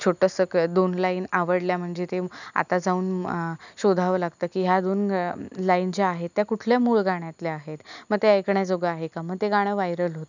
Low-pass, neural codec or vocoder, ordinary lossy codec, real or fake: 7.2 kHz; none; none; real